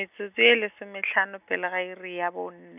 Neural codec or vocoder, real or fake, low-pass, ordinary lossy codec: none; real; 3.6 kHz; none